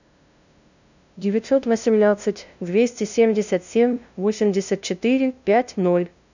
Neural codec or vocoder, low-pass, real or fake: codec, 16 kHz, 0.5 kbps, FunCodec, trained on LibriTTS, 25 frames a second; 7.2 kHz; fake